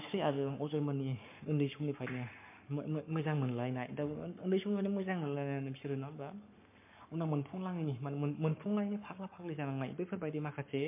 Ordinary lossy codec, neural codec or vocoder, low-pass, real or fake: MP3, 24 kbps; codec, 44.1 kHz, 7.8 kbps, Pupu-Codec; 3.6 kHz; fake